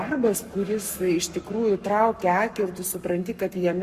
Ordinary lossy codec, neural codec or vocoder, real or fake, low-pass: Opus, 64 kbps; codec, 44.1 kHz, 7.8 kbps, Pupu-Codec; fake; 14.4 kHz